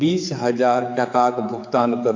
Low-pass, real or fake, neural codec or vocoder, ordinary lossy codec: 7.2 kHz; fake; codec, 16 kHz, 4 kbps, X-Codec, HuBERT features, trained on general audio; AAC, 48 kbps